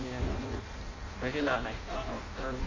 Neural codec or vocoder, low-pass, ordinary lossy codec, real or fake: codec, 16 kHz in and 24 kHz out, 0.6 kbps, FireRedTTS-2 codec; 7.2 kHz; AAC, 32 kbps; fake